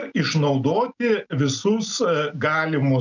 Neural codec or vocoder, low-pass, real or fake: none; 7.2 kHz; real